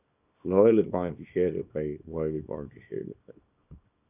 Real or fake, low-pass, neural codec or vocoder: fake; 3.6 kHz; codec, 24 kHz, 0.9 kbps, WavTokenizer, small release